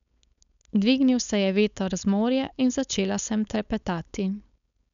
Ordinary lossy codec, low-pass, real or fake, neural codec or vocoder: none; 7.2 kHz; fake; codec, 16 kHz, 4.8 kbps, FACodec